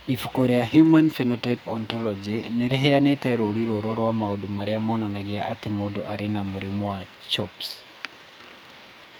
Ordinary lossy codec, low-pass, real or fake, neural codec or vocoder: none; none; fake; codec, 44.1 kHz, 2.6 kbps, SNAC